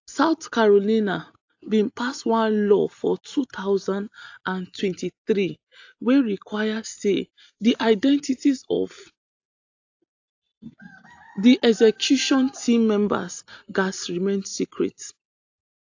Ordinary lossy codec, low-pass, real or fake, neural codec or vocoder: AAC, 48 kbps; 7.2 kHz; real; none